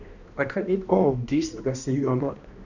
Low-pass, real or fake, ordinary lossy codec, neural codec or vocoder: 7.2 kHz; fake; none; codec, 16 kHz, 1 kbps, X-Codec, HuBERT features, trained on balanced general audio